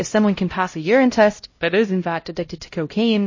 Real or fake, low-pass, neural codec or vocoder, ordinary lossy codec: fake; 7.2 kHz; codec, 16 kHz, 0.5 kbps, X-Codec, HuBERT features, trained on LibriSpeech; MP3, 32 kbps